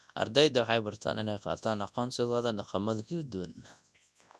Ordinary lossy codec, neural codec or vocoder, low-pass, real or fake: none; codec, 24 kHz, 0.9 kbps, WavTokenizer, large speech release; none; fake